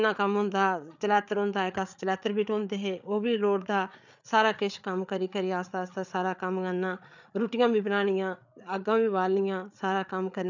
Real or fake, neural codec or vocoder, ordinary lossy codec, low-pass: fake; codec, 16 kHz, 8 kbps, FreqCodec, larger model; none; 7.2 kHz